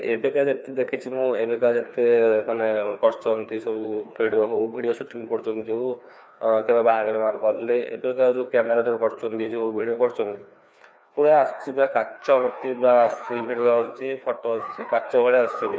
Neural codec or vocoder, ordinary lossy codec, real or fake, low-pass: codec, 16 kHz, 2 kbps, FreqCodec, larger model; none; fake; none